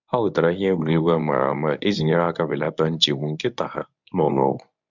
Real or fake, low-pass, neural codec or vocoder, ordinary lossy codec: fake; 7.2 kHz; codec, 24 kHz, 0.9 kbps, WavTokenizer, medium speech release version 1; none